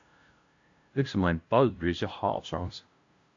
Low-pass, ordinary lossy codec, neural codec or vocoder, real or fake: 7.2 kHz; AAC, 48 kbps; codec, 16 kHz, 0.5 kbps, FunCodec, trained on LibriTTS, 25 frames a second; fake